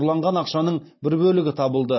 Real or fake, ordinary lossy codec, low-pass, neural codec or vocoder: real; MP3, 24 kbps; 7.2 kHz; none